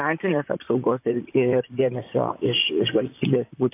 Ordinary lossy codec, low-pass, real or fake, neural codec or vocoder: AAC, 24 kbps; 3.6 kHz; fake; vocoder, 44.1 kHz, 128 mel bands, Pupu-Vocoder